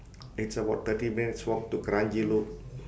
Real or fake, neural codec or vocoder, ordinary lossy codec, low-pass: real; none; none; none